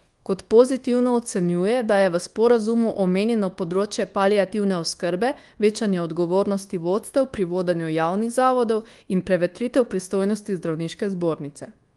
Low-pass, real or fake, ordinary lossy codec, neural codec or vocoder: 10.8 kHz; fake; Opus, 24 kbps; codec, 24 kHz, 1.2 kbps, DualCodec